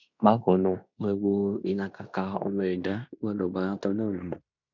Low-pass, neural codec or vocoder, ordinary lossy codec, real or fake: 7.2 kHz; codec, 16 kHz in and 24 kHz out, 0.9 kbps, LongCat-Audio-Codec, fine tuned four codebook decoder; none; fake